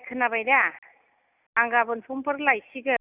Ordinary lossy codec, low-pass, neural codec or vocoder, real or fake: none; 3.6 kHz; none; real